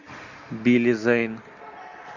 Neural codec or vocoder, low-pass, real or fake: none; 7.2 kHz; real